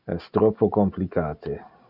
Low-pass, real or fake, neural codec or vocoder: 5.4 kHz; real; none